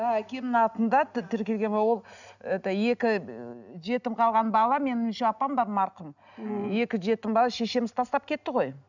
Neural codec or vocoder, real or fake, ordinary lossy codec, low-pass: none; real; none; 7.2 kHz